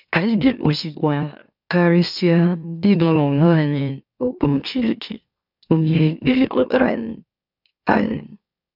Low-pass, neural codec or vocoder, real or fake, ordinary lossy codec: 5.4 kHz; autoencoder, 44.1 kHz, a latent of 192 numbers a frame, MeloTTS; fake; none